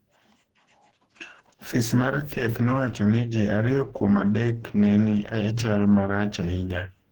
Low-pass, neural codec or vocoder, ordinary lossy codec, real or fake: 19.8 kHz; codec, 44.1 kHz, 2.6 kbps, DAC; Opus, 16 kbps; fake